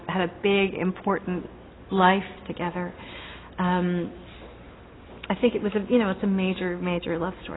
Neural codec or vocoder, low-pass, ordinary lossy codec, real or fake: none; 7.2 kHz; AAC, 16 kbps; real